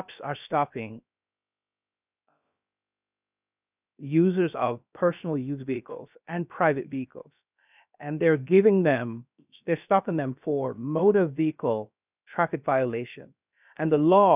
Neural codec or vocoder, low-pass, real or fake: codec, 16 kHz, about 1 kbps, DyCAST, with the encoder's durations; 3.6 kHz; fake